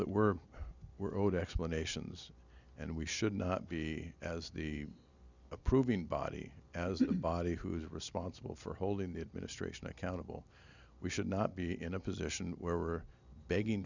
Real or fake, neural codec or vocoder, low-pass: real; none; 7.2 kHz